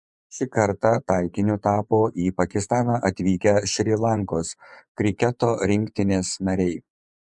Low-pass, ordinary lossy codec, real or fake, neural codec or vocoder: 10.8 kHz; MP3, 96 kbps; fake; vocoder, 24 kHz, 100 mel bands, Vocos